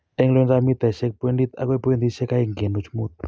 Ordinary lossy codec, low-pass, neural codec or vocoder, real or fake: none; none; none; real